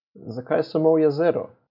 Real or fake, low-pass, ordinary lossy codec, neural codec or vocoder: real; 5.4 kHz; none; none